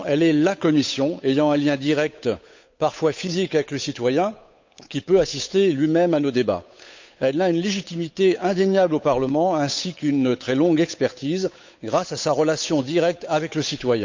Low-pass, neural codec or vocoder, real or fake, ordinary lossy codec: 7.2 kHz; codec, 16 kHz, 8 kbps, FunCodec, trained on Chinese and English, 25 frames a second; fake; MP3, 64 kbps